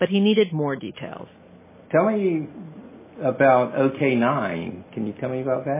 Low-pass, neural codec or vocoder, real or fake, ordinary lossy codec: 3.6 kHz; none; real; MP3, 16 kbps